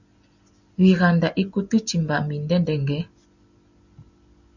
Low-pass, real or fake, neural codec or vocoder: 7.2 kHz; real; none